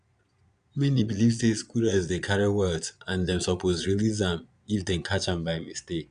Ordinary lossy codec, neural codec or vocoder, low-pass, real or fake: none; vocoder, 22.05 kHz, 80 mel bands, Vocos; 9.9 kHz; fake